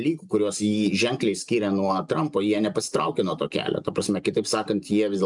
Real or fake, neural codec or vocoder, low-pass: real; none; 10.8 kHz